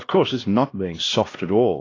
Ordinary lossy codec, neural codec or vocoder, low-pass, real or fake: AAC, 32 kbps; codec, 16 kHz, 1 kbps, X-Codec, HuBERT features, trained on LibriSpeech; 7.2 kHz; fake